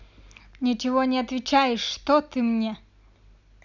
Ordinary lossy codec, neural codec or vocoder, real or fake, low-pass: none; none; real; 7.2 kHz